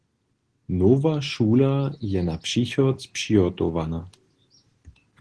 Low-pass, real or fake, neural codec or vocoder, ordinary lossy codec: 10.8 kHz; real; none; Opus, 16 kbps